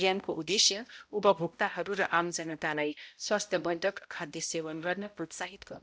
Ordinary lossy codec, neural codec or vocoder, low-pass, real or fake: none; codec, 16 kHz, 0.5 kbps, X-Codec, HuBERT features, trained on balanced general audio; none; fake